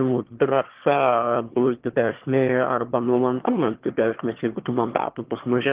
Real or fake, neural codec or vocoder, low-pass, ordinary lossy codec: fake; autoencoder, 22.05 kHz, a latent of 192 numbers a frame, VITS, trained on one speaker; 3.6 kHz; Opus, 16 kbps